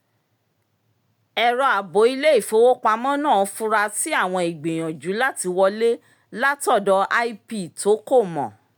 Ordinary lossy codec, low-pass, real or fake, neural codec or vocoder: none; 19.8 kHz; real; none